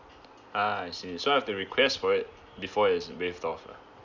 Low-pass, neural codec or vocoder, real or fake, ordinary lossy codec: 7.2 kHz; none; real; none